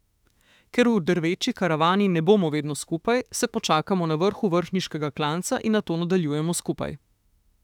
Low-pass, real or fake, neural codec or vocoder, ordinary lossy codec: 19.8 kHz; fake; autoencoder, 48 kHz, 32 numbers a frame, DAC-VAE, trained on Japanese speech; none